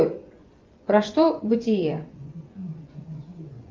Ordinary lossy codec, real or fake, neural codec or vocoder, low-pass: Opus, 32 kbps; fake; vocoder, 24 kHz, 100 mel bands, Vocos; 7.2 kHz